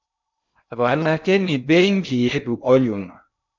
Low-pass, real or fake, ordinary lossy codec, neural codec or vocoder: 7.2 kHz; fake; MP3, 64 kbps; codec, 16 kHz in and 24 kHz out, 0.6 kbps, FocalCodec, streaming, 2048 codes